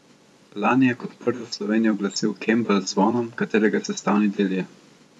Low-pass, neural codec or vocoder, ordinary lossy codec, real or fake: none; vocoder, 24 kHz, 100 mel bands, Vocos; none; fake